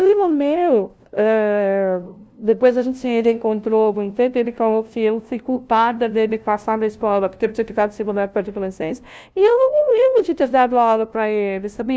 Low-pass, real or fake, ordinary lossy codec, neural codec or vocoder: none; fake; none; codec, 16 kHz, 0.5 kbps, FunCodec, trained on LibriTTS, 25 frames a second